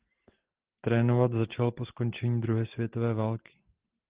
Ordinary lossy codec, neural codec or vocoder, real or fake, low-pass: Opus, 16 kbps; none; real; 3.6 kHz